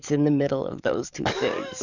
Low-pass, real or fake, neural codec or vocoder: 7.2 kHz; fake; codec, 44.1 kHz, 7.8 kbps, DAC